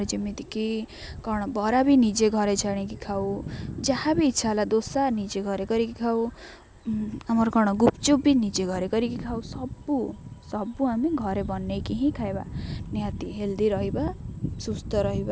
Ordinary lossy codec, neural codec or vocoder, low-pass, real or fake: none; none; none; real